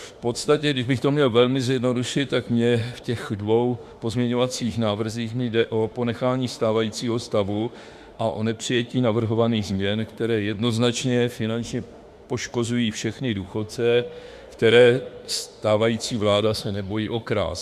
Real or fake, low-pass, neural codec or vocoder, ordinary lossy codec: fake; 14.4 kHz; autoencoder, 48 kHz, 32 numbers a frame, DAC-VAE, trained on Japanese speech; Opus, 64 kbps